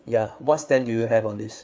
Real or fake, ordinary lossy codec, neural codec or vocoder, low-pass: fake; none; codec, 16 kHz, 4 kbps, FreqCodec, larger model; none